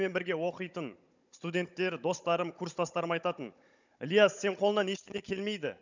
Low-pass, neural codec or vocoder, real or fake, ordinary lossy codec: 7.2 kHz; codec, 16 kHz, 16 kbps, FunCodec, trained on Chinese and English, 50 frames a second; fake; none